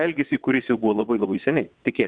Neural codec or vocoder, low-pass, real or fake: vocoder, 22.05 kHz, 80 mel bands, WaveNeXt; 9.9 kHz; fake